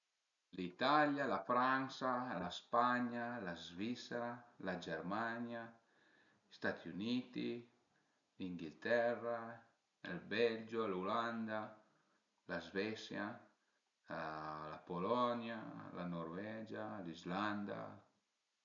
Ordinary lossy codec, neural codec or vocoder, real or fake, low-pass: none; none; real; 7.2 kHz